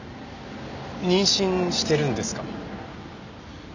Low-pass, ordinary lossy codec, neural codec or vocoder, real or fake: 7.2 kHz; none; none; real